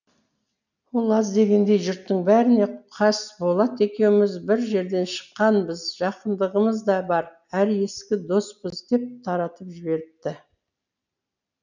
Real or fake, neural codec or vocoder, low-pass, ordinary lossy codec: real; none; 7.2 kHz; none